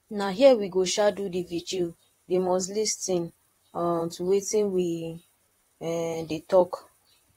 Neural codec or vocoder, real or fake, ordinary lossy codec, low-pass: vocoder, 44.1 kHz, 128 mel bands, Pupu-Vocoder; fake; AAC, 48 kbps; 19.8 kHz